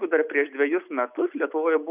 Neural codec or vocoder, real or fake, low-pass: none; real; 3.6 kHz